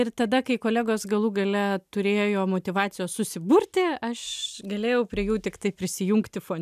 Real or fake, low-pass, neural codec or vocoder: real; 14.4 kHz; none